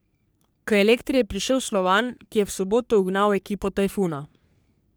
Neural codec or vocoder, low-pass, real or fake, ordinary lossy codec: codec, 44.1 kHz, 3.4 kbps, Pupu-Codec; none; fake; none